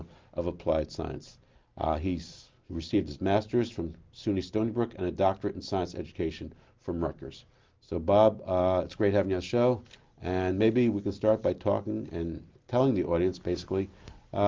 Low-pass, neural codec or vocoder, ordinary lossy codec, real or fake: 7.2 kHz; none; Opus, 32 kbps; real